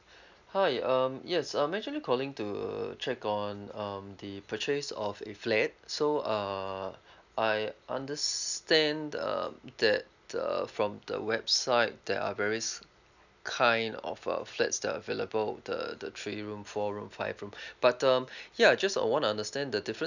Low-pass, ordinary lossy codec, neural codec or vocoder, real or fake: 7.2 kHz; none; none; real